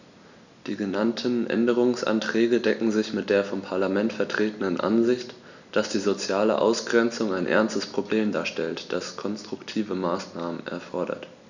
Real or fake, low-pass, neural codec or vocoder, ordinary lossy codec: real; 7.2 kHz; none; none